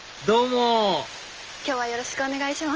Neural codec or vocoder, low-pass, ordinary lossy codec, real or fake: none; 7.2 kHz; Opus, 24 kbps; real